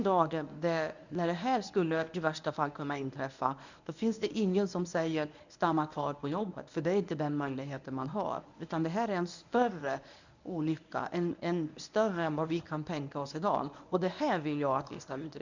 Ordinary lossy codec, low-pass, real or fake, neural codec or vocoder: none; 7.2 kHz; fake; codec, 24 kHz, 0.9 kbps, WavTokenizer, medium speech release version 1